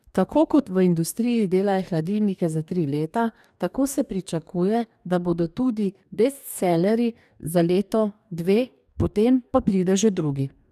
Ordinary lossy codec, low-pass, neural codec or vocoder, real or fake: none; 14.4 kHz; codec, 44.1 kHz, 2.6 kbps, DAC; fake